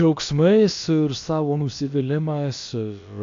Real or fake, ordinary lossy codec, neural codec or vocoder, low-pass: fake; AAC, 48 kbps; codec, 16 kHz, about 1 kbps, DyCAST, with the encoder's durations; 7.2 kHz